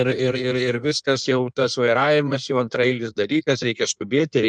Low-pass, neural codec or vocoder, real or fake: 9.9 kHz; codec, 16 kHz in and 24 kHz out, 1.1 kbps, FireRedTTS-2 codec; fake